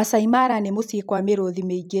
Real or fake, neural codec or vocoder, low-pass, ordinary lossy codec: fake; vocoder, 44.1 kHz, 128 mel bands every 256 samples, BigVGAN v2; 19.8 kHz; none